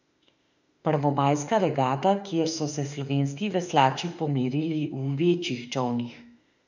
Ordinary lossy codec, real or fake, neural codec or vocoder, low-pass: none; fake; autoencoder, 48 kHz, 32 numbers a frame, DAC-VAE, trained on Japanese speech; 7.2 kHz